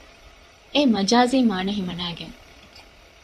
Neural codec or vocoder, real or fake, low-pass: vocoder, 44.1 kHz, 128 mel bands, Pupu-Vocoder; fake; 14.4 kHz